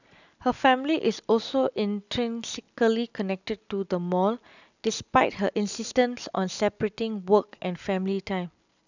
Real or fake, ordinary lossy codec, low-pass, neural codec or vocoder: real; none; 7.2 kHz; none